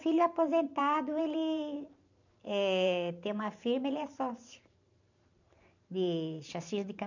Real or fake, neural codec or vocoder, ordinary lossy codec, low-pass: fake; vocoder, 44.1 kHz, 128 mel bands every 512 samples, BigVGAN v2; none; 7.2 kHz